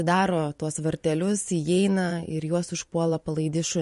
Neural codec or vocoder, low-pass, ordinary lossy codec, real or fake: vocoder, 44.1 kHz, 128 mel bands every 512 samples, BigVGAN v2; 14.4 kHz; MP3, 48 kbps; fake